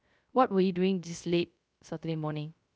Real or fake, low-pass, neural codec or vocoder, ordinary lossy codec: fake; none; codec, 16 kHz, 0.3 kbps, FocalCodec; none